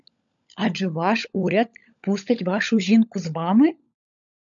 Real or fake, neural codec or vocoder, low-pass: fake; codec, 16 kHz, 16 kbps, FunCodec, trained on LibriTTS, 50 frames a second; 7.2 kHz